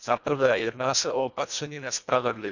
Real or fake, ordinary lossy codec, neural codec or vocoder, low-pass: fake; none; codec, 24 kHz, 1.5 kbps, HILCodec; 7.2 kHz